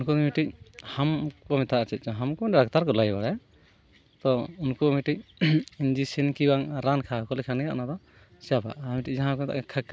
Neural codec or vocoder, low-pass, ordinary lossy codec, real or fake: none; none; none; real